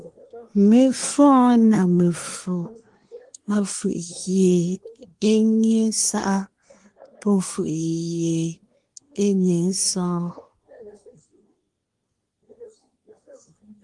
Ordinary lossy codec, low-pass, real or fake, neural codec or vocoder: Opus, 24 kbps; 10.8 kHz; fake; codec, 24 kHz, 1 kbps, SNAC